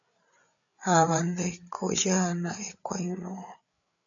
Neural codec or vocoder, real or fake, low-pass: codec, 16 kHz, 8 kbps, FreqCodec, larger model; fake; 7.2 kHz